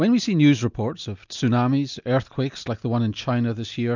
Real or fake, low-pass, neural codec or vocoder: real; 7.2 kHz; none